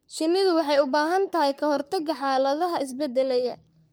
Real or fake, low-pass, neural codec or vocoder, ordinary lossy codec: fake; none; codec, 44.1 kHz, 3.4 kbps, Pupu-Codec; none